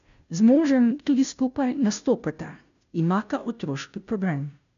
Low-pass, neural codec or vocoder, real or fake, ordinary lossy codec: 7.2 kHz; codec, 16 kHz, 0.5 kbps, FunCodec, trained on Chinese and English, 25 frames a second; fake; none